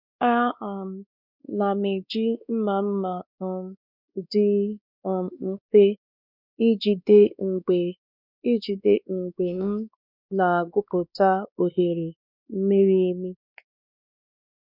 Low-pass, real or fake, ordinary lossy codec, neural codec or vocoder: 5.4 kHz; fake; none; codec, 16 kHz, 2 kbps, X-Codec, WavLM features, trained on Multilingual LibriSpeech